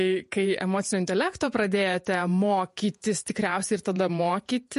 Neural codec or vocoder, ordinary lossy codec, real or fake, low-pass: vocoder, 48 kHz, 128 mel bands, Vocos; MP3, 48 kbps; fake; 14.4 kHz